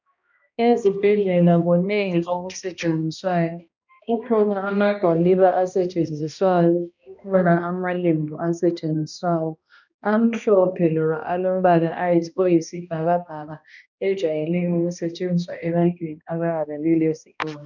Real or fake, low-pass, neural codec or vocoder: fake; 7.2 kHz; codec, 16 kHz, 1 kbps, X-Codec, HuBERT features, trained on balanced general audio